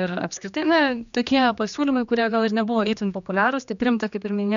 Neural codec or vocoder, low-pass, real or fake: codec, 16 kHz, 2 kbps, X-Codec, HuBERT features, trained on general audio; 7.2 kHz; fake